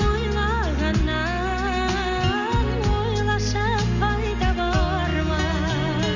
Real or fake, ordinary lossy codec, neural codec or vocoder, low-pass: real; none; none; 7.2 kHz